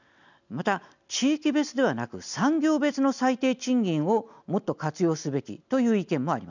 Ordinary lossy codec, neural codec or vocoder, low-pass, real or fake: none; none; 7.2 kHz; real